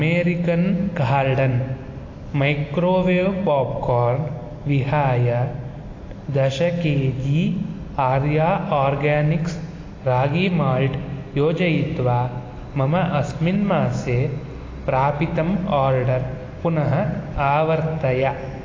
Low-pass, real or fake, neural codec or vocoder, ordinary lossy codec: 7.2 kHz; real; none; AAC, 32 kbps